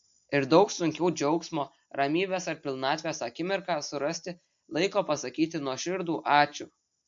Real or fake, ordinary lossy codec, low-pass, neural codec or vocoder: real; MP3, 48 kbps; 7.2 kHz; none